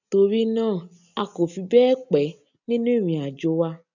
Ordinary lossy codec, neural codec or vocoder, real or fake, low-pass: none; none; real; 7.2 kHz